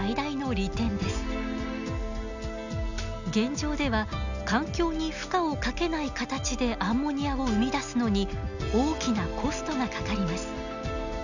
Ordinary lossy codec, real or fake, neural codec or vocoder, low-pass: none; real; none; 7.2 kHz